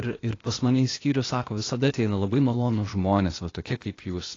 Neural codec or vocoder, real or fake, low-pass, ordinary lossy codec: codec, 16 kHz, 0.8 kbps, ZipCodec; fake; 7.2 kHz; AAC, 32 kbps